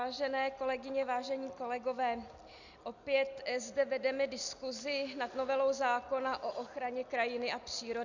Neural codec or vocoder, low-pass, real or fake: none; 7.2 kHz; real